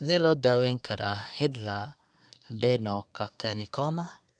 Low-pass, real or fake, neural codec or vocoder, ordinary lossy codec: 9.9 kHz; fake; codec, 24 kHz, 1 kbps, SNAC; none